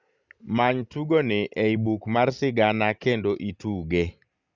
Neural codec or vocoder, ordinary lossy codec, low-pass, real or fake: none; Opus, 64 kbps; 7.2 kHz; real